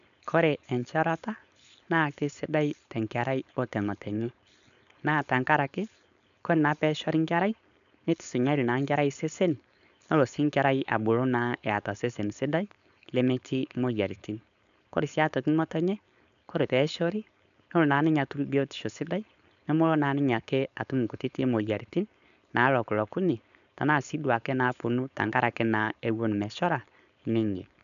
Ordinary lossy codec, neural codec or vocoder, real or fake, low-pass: none; codec, 16 kHz, 4.8 kbps, FACodec; fake; 7.2 kHz